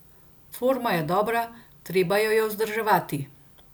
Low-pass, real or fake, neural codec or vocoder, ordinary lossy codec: none; real; none; none